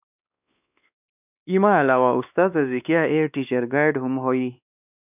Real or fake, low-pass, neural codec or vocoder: fake; 3.6 kHz; codec, 16 kHz, 2 kbps, X-Codec, WavLM features, trained on Multilingual LibriSpeech